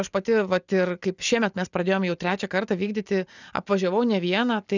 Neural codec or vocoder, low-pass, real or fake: none; 7.2 kHz; real